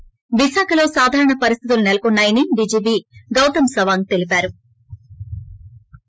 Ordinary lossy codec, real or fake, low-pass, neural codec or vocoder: none; real; none; none